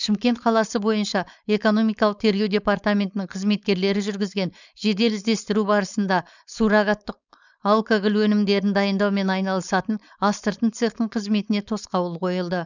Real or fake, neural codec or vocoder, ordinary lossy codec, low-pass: fake; codec, 16 kHz, 4.8 kbps, FACodec; none; 7.2 kHz